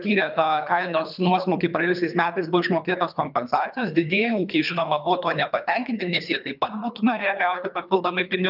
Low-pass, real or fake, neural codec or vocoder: 5.4 kHz; fake; codec, 24 kHz, 3 kbps, HILCodec